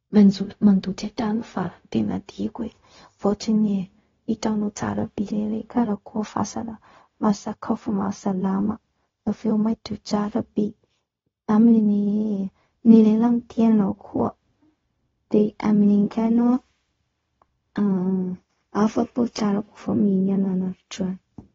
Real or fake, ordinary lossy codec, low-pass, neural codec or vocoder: fake; AAC, 24 kbps; 7.2 kHz; codec, 16 kHz, 0.4 kbps, LongCat-Audio-Codec